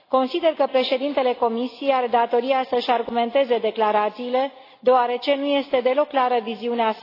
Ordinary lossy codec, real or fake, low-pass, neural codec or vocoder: AAC, 24 kbps; real; 5.4 kHz; none